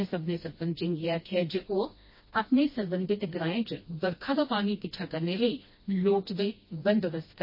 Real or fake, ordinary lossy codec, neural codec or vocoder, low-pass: fake; MP3, 24 kbps; codec, 16 kHz, 1 kbps, FreqCodec, smaller model; 5.4 kHz